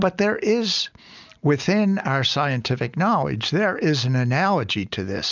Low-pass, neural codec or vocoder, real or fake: 7.2 kHz; none; real